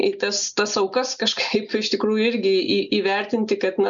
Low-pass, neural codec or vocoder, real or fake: 7.2 kHz; none; real